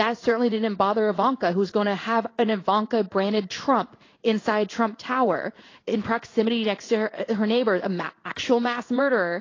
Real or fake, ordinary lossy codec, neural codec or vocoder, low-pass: fake; AAC, 32 kbps; codec, 16 kHz in and 24 kHz out, 1 kbps, XY-Tokenizer; 7.2 kHz